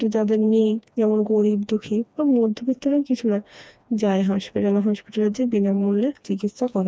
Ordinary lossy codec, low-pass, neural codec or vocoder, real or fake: none; none; codec, 16 kHz, 2 kbps, FreqCodec, smaller model; fake